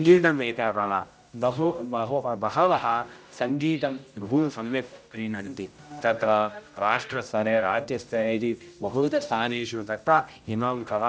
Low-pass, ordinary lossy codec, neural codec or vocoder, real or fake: none; none; codec, 16 kHz, 0.5 kbps, X-Codec, HuBERT features, trained on general audio; fake